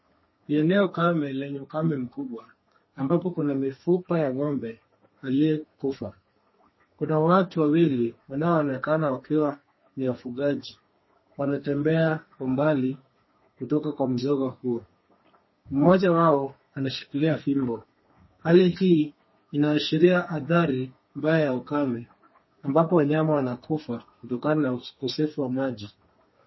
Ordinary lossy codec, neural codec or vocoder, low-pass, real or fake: MP3, 24 kbps; codec, 32 kHz, 1.9 kbps, SNAC; 7.2 kHz; fake